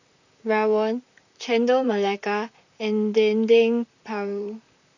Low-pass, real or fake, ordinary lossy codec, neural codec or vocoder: 7.2 kHz; fake; none; vocoder, 44.1 kHz, 128 mel bands, Pupu-Vocoder